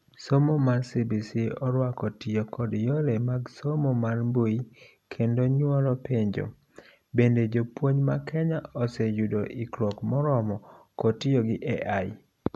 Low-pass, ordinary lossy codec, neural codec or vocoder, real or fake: none; none; none; real